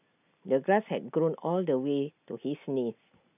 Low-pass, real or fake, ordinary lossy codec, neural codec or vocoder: 3.6 kHz; real; none; none